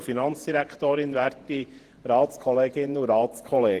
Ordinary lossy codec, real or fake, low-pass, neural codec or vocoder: Opus, 16 kbps; fake; 14.4 kHz; vocoder, 48 kHz, 128 mel bands, Vocos